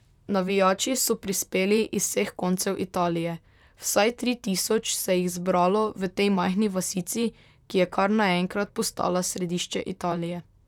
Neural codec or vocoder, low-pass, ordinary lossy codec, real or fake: vocoder, 44.1 kHz, 128 mel bands, Pupu-Vocoder; 19.8 kHz; none; fake